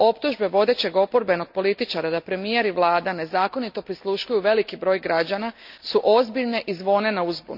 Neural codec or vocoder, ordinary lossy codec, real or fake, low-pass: none; none; real; 5.4 kHz